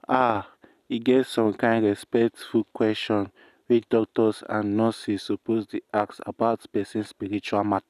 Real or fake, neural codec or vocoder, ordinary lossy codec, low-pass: real; none; none; 14.4 kHz